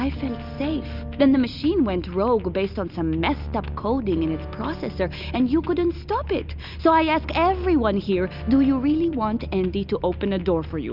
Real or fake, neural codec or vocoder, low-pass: real; none; 5.4 kHz